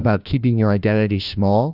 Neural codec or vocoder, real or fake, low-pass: codec, 16 kHz, 1 kbps, FunCodec, trained on LibriTTS, 50 frames a second; fake; 5.4 kHz